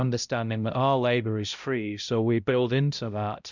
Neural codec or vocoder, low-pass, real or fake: codec, 16 kHz, 0.5 kbps, X-Codec, HuBERT features, trained on balanced general audio; 7.2 kHz; fake